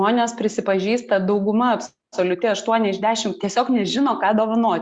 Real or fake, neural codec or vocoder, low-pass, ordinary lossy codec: real; none; 9.9 kHz; Opus, 64 kbps